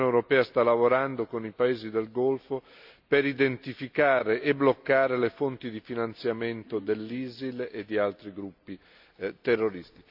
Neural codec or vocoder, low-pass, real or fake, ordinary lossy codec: none; 5.4 kHz; real; AAC, 48 kbps